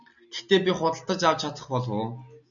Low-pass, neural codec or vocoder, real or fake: 7.2 kHz; none; real